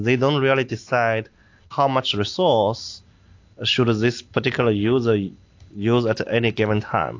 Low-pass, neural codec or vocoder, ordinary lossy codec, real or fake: 7.2 kHz; none; AAC, 48 kbps; real